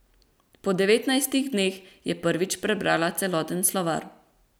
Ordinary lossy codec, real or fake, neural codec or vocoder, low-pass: none; real; none; none